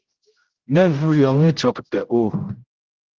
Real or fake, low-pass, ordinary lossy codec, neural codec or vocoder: fake; 7.2 kHz; Opus, 16 kbps; codec, 16 kHz, 0.5 kbps, X-Codec, HuBERT features, trained on general audio